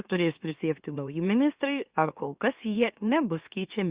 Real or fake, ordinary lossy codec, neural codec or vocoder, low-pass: fake; Opus, 64 kbps; autoencoder, 44.1 kHz, a latent of 192 numbers a frame, MeloTTS; 3.6 kHz